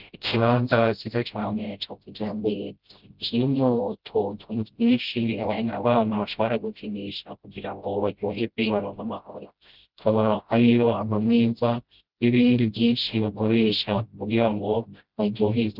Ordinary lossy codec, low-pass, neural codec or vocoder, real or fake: Opus, 24 kbps; 5.4 kHz; codec, 16 kHz, 0.5 kbps, FreqCodec, smaller model; fake